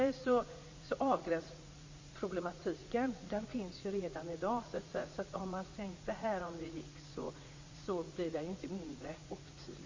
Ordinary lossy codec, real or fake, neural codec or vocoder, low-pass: MP3, 32 kbps; fake; vocoder, 44.1 kHz, 80 mel bands, Vocos; 7.2 kHz